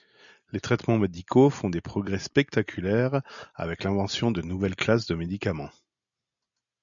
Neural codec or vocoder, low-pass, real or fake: none; 7.2 kHz; real